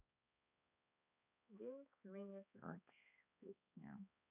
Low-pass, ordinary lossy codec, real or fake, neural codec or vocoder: 3.6 kHz; none; fake; codec, 16 kHz, 2 kbps, X-Codec, HuBERT features, trained on balanced general audio